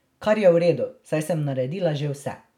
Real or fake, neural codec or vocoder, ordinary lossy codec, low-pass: real; none; none; 19.8 kHz